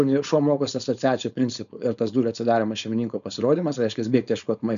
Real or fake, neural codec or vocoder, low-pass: fake; codec, 16 kHz, 4.8 kbps, FACodec; 7.2 kHz